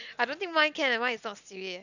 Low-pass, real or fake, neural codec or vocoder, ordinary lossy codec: 7.2 kHz; real; none; none